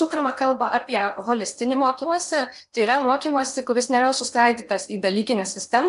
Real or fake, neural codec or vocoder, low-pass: fake; codec, 16 kHz in and 24 kHz out, 0.8 kbps, FocalCodec, streaming, 65536 codes; 10.8 kHz